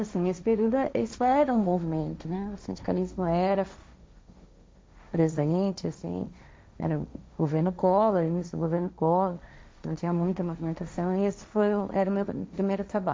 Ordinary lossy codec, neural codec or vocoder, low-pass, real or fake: none; codec, 16 kHz, 1.1 kbps, Voila-Tokenizer; none; fake